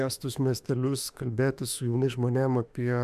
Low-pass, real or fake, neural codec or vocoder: 14.4 kHz; fake; autoencoder, 48 kHz, 32 numbers a frame, DAC-VAE, trained on Japanese speech